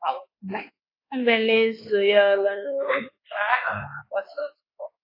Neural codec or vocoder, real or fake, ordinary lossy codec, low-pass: codec, 24 kHz, 1.2 kbps, DualCodec; fake; AAC, 24 kbps; 5.4 kHz